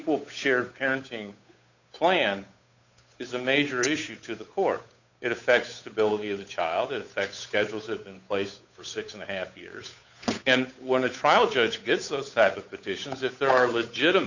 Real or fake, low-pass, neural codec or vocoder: fake; 7.2 kHz; codec, 16 kHz, 8 kbps, FunCodec, trained on Chinese and English, 25 frames a second